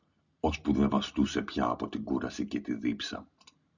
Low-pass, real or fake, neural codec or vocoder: 7.2 kHz; real; none